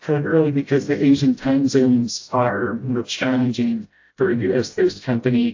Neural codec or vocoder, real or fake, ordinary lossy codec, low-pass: codec, 16 kHz, 0.5 kbps, FreqCodec, smaller model; fake; AAC, 48 kbps; 7.2 kHz